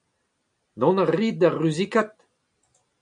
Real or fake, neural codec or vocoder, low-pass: real; none; 9.9 kHz